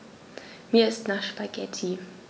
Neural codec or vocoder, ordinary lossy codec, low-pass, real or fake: none; none; none; real